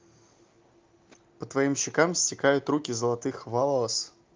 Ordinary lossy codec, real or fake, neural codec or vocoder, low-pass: Opus, 32 kbps; real; none; 7.2 kHz